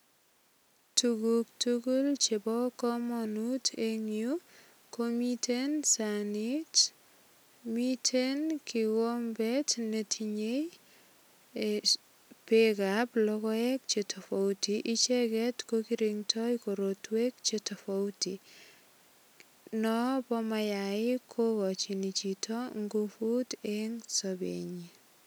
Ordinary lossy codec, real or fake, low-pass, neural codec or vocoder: none; real; none; none